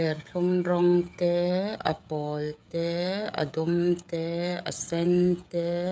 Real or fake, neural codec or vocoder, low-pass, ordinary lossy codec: fake; codec, 16 kHz, 16 kbps, FreqCodec, smaller model; none; none